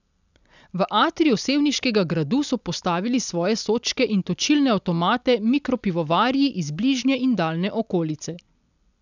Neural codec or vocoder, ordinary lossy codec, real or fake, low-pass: none; none; real; 7.2 kHz